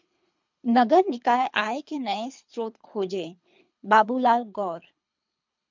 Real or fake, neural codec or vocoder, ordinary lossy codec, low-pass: fake; codec, 24 kHz, 3 kbps, HILCodec; MP3, 64 kbps; 7.2 kHz